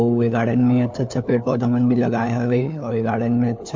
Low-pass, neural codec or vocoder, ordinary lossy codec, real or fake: 7.2 kHz; codec, 16 kHz, 4 kbps, FunCodec, trained on LibriTTS, 50 frames a second; MP3, 48 kbps; fake